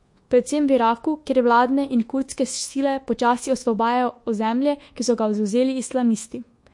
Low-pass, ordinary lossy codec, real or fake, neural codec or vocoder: 10.8 kHz; MP3, 48 kbps; fake; codec, 24 kHz, 1.2 kbps, DualCodec